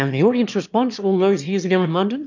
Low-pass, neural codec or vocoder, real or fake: 7.2 kHz; autoencoder, 22.05 kHz, a latent of 192 numbers a frame, VITS, trained on one speaker; fake